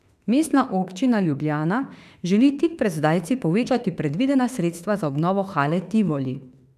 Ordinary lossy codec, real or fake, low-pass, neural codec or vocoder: none; fake; 14.4 kHz; autoencoder, 48 kHz, 32 numbers a frame, DAC-VAE, trained on Japanese speech